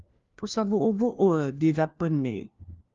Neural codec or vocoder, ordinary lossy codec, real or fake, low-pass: codec, 16 kHz, 1 kbps, FunCodec, trained on LibriTTS, 50 frames a second; Opus, 32 kbps; fake; 7.2 kHz